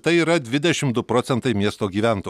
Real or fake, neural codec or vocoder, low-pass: real; none; 14.4 kHz